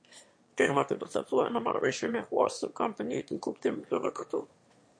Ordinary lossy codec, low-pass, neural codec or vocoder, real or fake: MP3, 48 kbps; 9.9 kHz; autoencoder, 22.05 kHz, a latent of 192 numbers a frame, VITS, trained on one speaker; fake